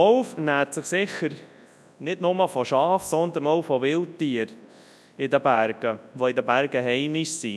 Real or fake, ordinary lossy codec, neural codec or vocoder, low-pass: fake; none; codec, 24 kHz, 0.9 kbps, WavTokenizer, large speech release; none